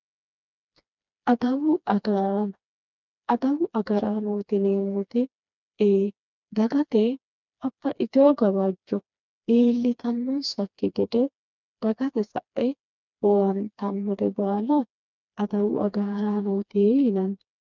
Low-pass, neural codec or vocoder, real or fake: 7.2 kHz; codec, 16 kHz, 2 kbps, FreqCodec, smaller model; fake